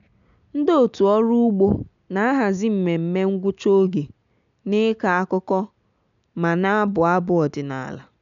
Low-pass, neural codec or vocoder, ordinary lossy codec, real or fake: 7.2 kHz; none; none; real